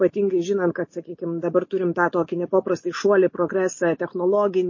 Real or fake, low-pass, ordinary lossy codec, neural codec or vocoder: real; 7.2 kHz; MP3, 32 kbps; none